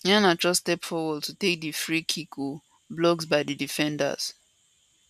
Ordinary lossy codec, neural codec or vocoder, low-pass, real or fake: none; none; 14.4 kHz; real